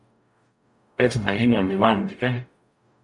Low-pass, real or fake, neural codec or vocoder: 10.8 kHz; fake; codec, 44.1 kHz, 0.9 kbps, DAC